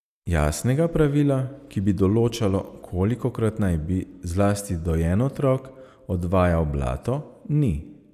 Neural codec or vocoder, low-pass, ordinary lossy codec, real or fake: none; 14.4 kHz; none; real